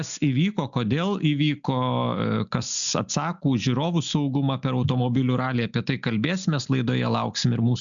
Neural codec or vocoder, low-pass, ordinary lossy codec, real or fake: none; 7.2 kHz; MP3, 96 kbps; real